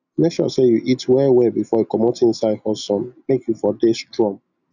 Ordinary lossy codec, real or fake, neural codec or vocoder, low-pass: none; real; none; 7.2 kHz